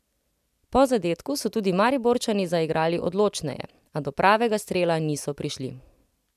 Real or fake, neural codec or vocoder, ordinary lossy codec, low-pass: real; none; none; 14.4 kHz